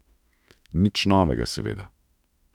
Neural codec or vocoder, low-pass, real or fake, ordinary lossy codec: autoencoder, 48 kHz, 32 numbers a frame, DAC-VAE, trained on Japanese speech; 19.8 kHz; fake; none